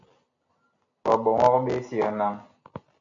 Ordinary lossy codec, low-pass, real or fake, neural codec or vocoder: AAC, 64 kbps; 7.2 kHz; real; none